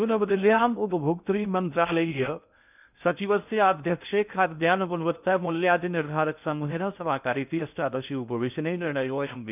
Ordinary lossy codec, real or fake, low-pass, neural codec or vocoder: none; fake; 3.6 kHz; codec, 16 kHz in and 24 kHz out, 0.6 kbps, FocalCodec, streaming, 2048 codes